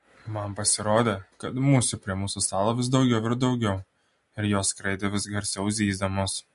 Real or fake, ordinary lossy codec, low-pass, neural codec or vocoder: real; MP3, 48 kbps; 10.8 kHz; none